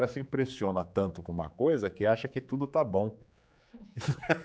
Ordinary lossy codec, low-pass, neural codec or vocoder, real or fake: none; none; codec, 16 kHz, 4 kbps, X-Codec, HuBERT features, trained on general audio; fake